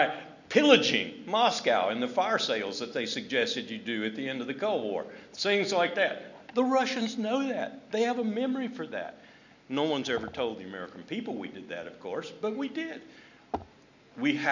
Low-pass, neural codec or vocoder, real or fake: 7.2 kHz; none; real